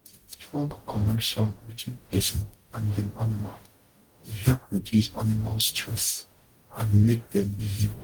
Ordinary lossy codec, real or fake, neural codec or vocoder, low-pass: Opus, 24 kbps; fake; codec, 44.1 kHz, 0.9 kbps, DAC; 19.8 kHz